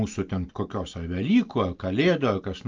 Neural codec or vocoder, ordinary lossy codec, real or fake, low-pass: none; Opus, 24 kbps; real; 7.2 kHz